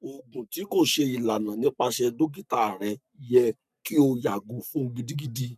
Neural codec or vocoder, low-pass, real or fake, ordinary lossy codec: codec, 44.1 kHz, 7.8 kbps, Pupu-Codec; 14.4 kHz; fake; none